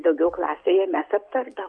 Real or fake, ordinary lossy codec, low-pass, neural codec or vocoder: real; MP3, 64 kbps; 9.9 kHz; none